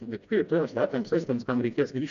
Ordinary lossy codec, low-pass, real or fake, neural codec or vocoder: AAC, 96 kbps; 7.2 kHz; fake; codec, 16 kHz, 1 kbps, FreqCodec, smaller model